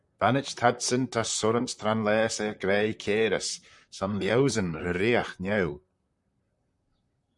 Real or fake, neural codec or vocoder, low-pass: fake; vocoder, 44.1 kHz, 128 mel bands, Pupu-Vocoder; 10.8 kHz